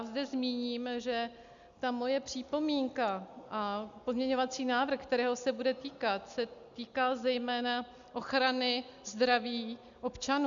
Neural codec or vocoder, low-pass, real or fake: none; 7.2 kHz; real